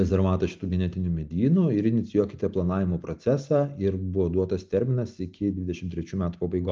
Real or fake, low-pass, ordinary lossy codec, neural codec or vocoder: real; 7.2 kHz; Opus, 24 kbps; none